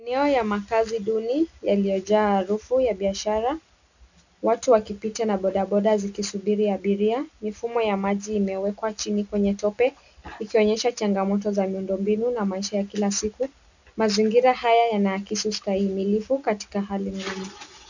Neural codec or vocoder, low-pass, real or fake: none; 7.2 kHz; real